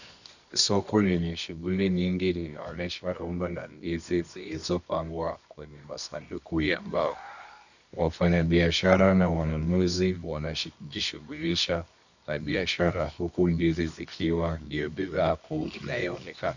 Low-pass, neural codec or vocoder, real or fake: 7.2 kHz; codec, 24 kHz, 0.9 kbps, WavTokenizer, medium music audio release; fake